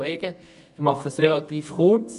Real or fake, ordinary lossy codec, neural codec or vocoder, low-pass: fake; none; codec, 24 kHz, 0.9 kbps, WavTokenizer, medium music audio release; 10.8 kHz